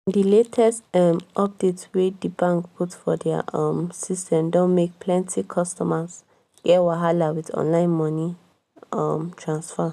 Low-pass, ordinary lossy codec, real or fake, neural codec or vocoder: 14.4 kHz; none; real; none